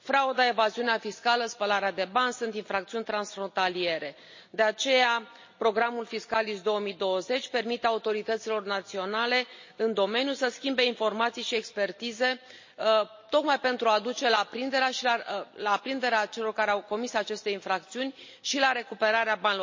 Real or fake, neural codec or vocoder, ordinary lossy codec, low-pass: real; none; MP3, 32 kbps; 7.2 kHz